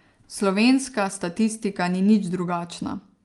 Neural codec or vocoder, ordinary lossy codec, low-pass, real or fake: none; Opus, 32 kbps; 10.8 kHz; real